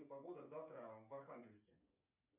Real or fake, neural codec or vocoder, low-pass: fake; codec, 16 kHz, 8 kbps, FreqCodec, smaller model; 3.6 kHz